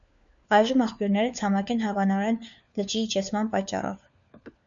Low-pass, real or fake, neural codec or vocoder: 7.2 kHz; fake; codec, 16 kHz, 4 kbps, FunCodec, trained on LibriTTS, 50 frames a second